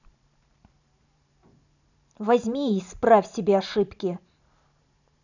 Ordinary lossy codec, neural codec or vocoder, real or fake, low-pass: none; vocoder, 44.1 kHz, 128 mel bands every 512 samples, BigVGAN v2; fake; 7.2 kHz